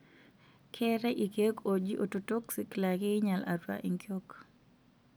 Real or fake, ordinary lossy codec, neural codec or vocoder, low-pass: real; none; none; none